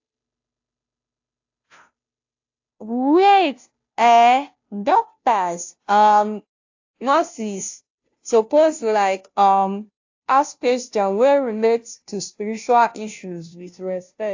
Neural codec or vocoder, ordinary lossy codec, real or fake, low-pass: codec, 16 kHz, 0.5 kbps, FunCodec, trained on Chinese and English, 25 frames a second; AAC, 48 kbps; fake; 7.2 kHz